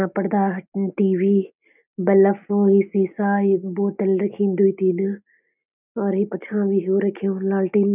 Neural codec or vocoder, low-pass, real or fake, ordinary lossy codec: none; 3.6 kHz; real; none